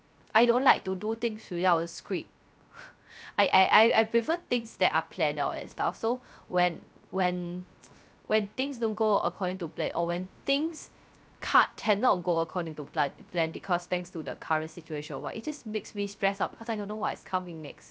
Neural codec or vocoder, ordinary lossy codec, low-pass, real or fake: codec, 16 kHz, 0.3 kbps, FocalCodec; none; none; fake